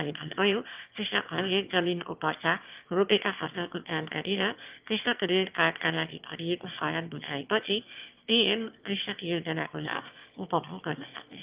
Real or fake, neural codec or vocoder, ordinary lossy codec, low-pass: fake; autoencoder, 22.05 kHz, a latent of 192 numbers a frame, VITS, trained on one speaker; Opus, 32 kbps; 3.6 kHz